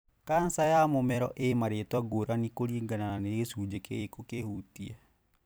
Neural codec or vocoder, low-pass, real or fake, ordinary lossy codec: vocoder, 44.1 kHz, 128 mel bands every 256 samples, BigVGAN v2; none; fake; none